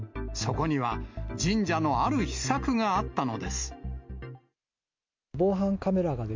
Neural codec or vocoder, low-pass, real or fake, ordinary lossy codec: none; 7.2 kHz; real; MP3, 48 kbps